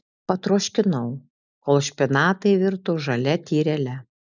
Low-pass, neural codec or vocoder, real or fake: 7.2 kHz; none; real